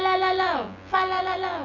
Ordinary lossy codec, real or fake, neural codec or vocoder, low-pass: none; fake; vocoder, 24 kHz, 100 mel bands, Vocos; 7.2 kHz